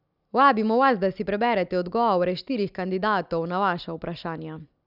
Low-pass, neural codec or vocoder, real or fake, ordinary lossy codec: 5.4 kHz; none; real; none